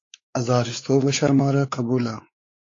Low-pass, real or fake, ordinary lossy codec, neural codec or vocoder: 7.2 kHz; fake; AAC, 32 kbps; codec, 16 kHz, 4 kbps, X-Codec, WavLM features, trained on Multilingual LibriSpeech